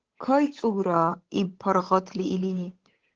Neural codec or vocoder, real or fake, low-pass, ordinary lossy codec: codec, 16 kHz, 8 kbps, FunCodec, trained on Chinese and English, 25 frames a second; fake; 7.2 kHz; Opus, 16 kbps